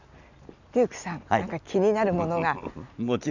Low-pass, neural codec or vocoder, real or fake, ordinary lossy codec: 7.2 kHz; none; real; none